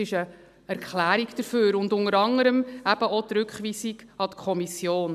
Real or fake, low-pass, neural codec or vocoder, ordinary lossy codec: real; 14.4 kHz; none; none